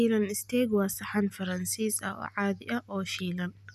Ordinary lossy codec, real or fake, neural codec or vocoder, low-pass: none; real; none; 14.4 kHz